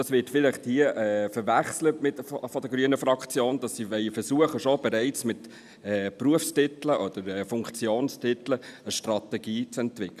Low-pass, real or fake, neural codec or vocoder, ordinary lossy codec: 14.4 kHz; real; none; none